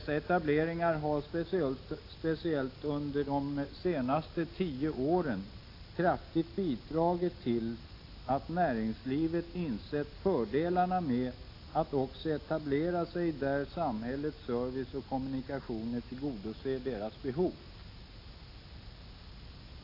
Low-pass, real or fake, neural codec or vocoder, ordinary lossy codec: 5.4 kHz; real; none; AAC, 32 kbps